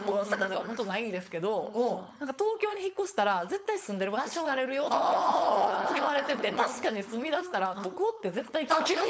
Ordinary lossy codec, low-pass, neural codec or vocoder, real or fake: none; none; codec, 16 kHz, 4.8 kbps, FACodec; fake